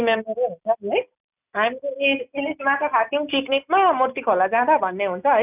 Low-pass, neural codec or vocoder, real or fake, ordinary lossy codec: 3.6 kHz; none; real; none